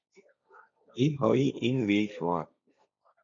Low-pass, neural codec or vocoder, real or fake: 7.2 kHz; codec, 16 kHz, 1.1 kbps, Voila-Tokenizer; fake